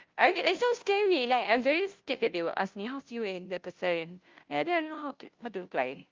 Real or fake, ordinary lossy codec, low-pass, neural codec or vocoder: fake; Opus, 32 kbps; 7.2 kHz; codec, 16 kHz, 0.5 kbps, FunCodec, trained on Chinese and English, 25 frames a second